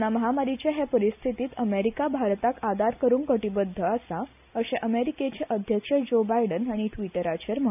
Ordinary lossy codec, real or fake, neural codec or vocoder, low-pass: none; real; none; 3.6 kHz